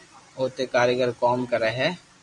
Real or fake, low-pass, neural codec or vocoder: fake; 10.8 kHz; vocoder, 44.1 kHz, 128 mel bands every 512 samples, BigVGAN v2